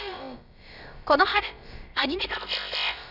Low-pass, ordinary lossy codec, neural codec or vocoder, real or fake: 5.4 kHz; none; codec, 16 kHz, about 1 kbps, DyCAST, with the encoder's durations; fake